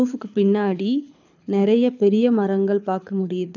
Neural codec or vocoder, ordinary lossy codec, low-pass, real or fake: codec, 24 kHz, 6 kbps, HILCodec; none; 7.2 kHz; fake